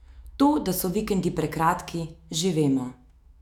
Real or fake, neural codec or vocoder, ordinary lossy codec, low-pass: fake; autoencoder, 48 kHz, 128 numbers a frame, DAC-VAE, trained on Japanese speech; none; 19.8 kHz